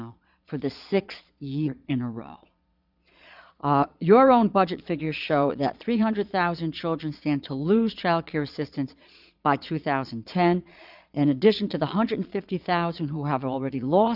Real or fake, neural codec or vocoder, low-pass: fake; vocoder, 22.05 kHz, 80 mel bands, Vocos; 5.4 kHz